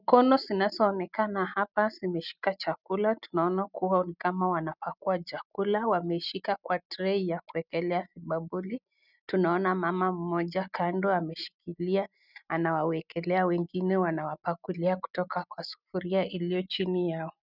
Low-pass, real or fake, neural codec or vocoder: 5.4 kHz; real; none